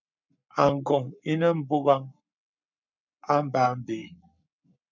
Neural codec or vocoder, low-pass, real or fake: codec, 44.1 kHz, 7.8 kbps, Pupu-Codec; 7.2 kHz; fake